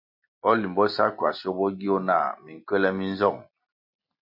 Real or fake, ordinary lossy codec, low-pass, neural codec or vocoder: real; MP3, 48 kbps; 5.4 kHz; none